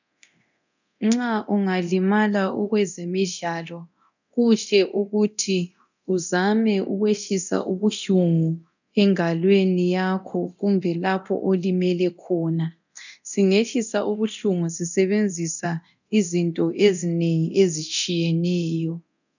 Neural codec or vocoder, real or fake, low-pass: codec, 24 kHz, 0.9 kbps, DualCodec; fake; 7.2 kHz